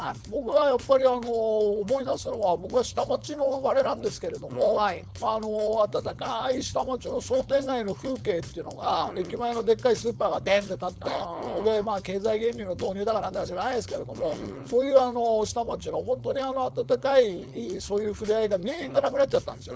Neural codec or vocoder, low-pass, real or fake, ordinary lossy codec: codec, 16 kHz, 4.8 kbps, FACodec; none; fake; none